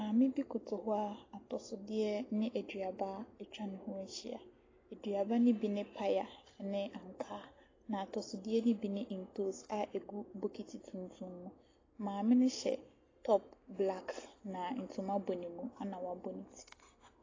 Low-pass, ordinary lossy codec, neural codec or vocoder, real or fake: 7.2 kHz; AAC, 32 kbps; none; real